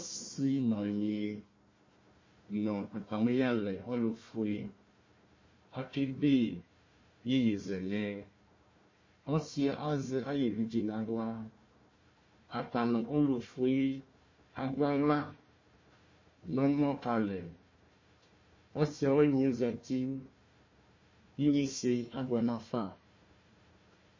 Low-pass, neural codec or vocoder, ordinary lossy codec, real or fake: 7.2 kHz; codec, 16 kHz, 1 kbps, FunCodec, trained on Chinese and English, 50 frames a second; MP3, 32 kbps; fake